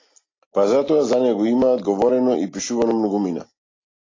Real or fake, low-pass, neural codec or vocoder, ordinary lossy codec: real; 7.2 kHz; none; AAC, 32 kbps